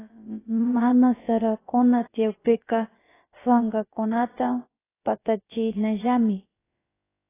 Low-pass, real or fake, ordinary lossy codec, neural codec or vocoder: 3.6 kHz; fake; AAC, 16 kbps; codec, 16 kHz, about 1 kbps, DyCAST, with the encoder's durations